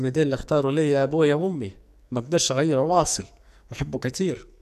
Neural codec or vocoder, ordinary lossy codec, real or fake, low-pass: codec, 44.1 kHz, 2.6 kbps, SNAC; none; fake; 14.4 kHz